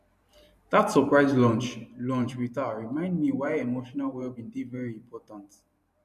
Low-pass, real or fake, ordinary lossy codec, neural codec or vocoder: 14.4 kHz; fake; MP3, 64 kbps; vocoder, 44.1 kHz, 128 mel bands every 512 samples, BigVGAN v2